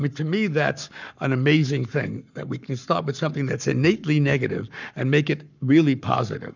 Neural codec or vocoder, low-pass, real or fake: codec, 44.1 kHz, 7.8 kbps, Pupu-Codec; 7.2 kHz; fake